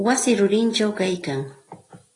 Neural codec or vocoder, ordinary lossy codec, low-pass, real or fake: none; AAC, 32 kbps; 10.8 kHz; real